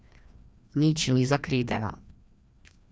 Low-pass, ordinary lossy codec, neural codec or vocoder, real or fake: none; none; codec, 16 kHz, 1 kbps, FreqCodec, larger model; fake